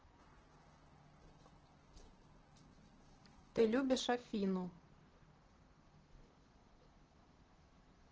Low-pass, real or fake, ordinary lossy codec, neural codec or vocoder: 7.2 kHz; fake; Opus, 16 kbps; vocoder, 44.1 kHz, 128 mel bands, Pupu-Vocoder